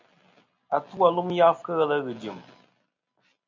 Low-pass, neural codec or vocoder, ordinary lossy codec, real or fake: 7.2 kHz; none; MP3, 64 kbps; real